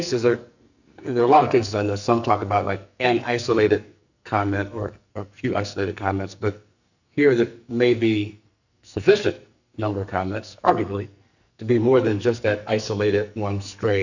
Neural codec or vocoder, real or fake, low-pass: codec, 44.1 kHz, 2.6 kbps, SNAC; fake; 7.2 kHz